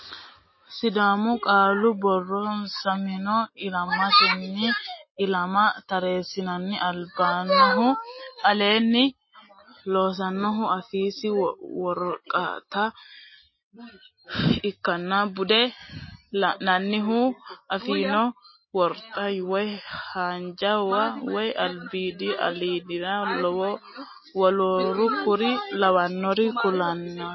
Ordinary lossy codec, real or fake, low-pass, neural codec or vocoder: MP3, 24 kbps; real; 7.2 kHz; none